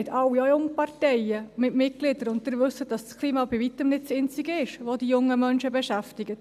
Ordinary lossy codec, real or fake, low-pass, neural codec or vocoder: none; real; 14.4 kHz; none